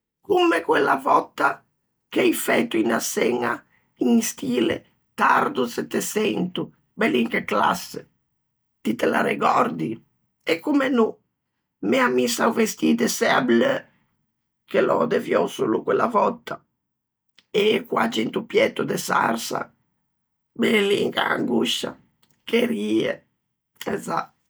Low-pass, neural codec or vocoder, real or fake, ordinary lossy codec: none; none; real; none